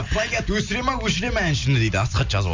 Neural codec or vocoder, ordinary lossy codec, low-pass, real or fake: none; MP3, 64 kbps; 7.2 kHz; real